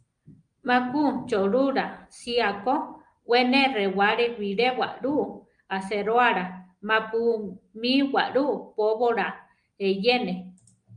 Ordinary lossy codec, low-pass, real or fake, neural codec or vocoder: Opus, 32 kbps; 9.9 kHz; real; none